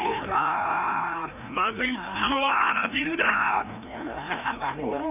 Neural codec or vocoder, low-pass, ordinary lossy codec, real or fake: codec, 16 kHz, 1 kbps, FreqCodec, larger model; 3.6 kHz; none; fake